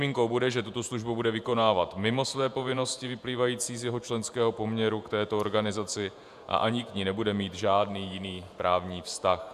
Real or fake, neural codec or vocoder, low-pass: fake; vocoder, 48 kHz, 128 mel bands, Vocos; 14.4 kHz